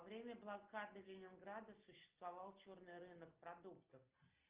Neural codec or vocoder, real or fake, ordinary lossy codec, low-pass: none; real; Opus, 24 kbps; 3.6 kHz